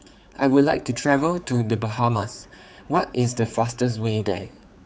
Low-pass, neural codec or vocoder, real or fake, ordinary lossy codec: none; codec, 16 kHz, 4 kbps, X-Codec, HuBERT features, trained on general audio; fake; none